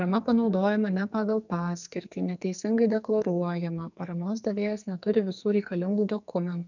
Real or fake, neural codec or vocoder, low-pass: fake; codec, 44.1 kHz, 2.6 kbps, SNAC; 7.2 kHz